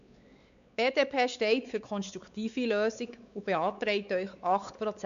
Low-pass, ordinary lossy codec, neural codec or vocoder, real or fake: 7.2 kHz; none; codec, 16 kHz, 4 kbps, X-Codec, WavLM features, trained on Multilingual LibriSpeech; fake